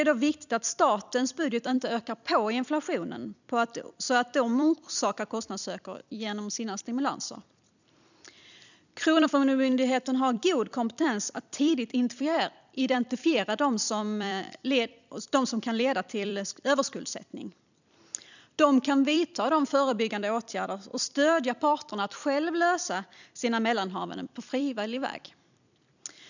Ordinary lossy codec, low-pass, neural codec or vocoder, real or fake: none; 7.2 kHz; vocoder, 44.1 kHz, 128 mel bands every 256 samples, BigVGAN v2; fake